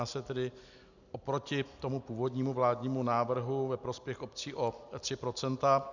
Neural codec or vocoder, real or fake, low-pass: none; real; 7.2 kHz